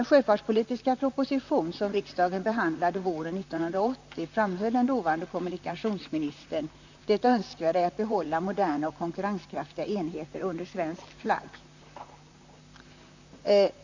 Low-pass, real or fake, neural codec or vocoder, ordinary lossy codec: 7.2 kHz; fake; vocoder, 44.1 kHz, 128 mel bands, Pupu-Vocoder; Opus, 64 kbps